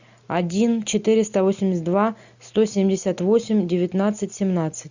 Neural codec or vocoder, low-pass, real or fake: none; 7.2 kHz; real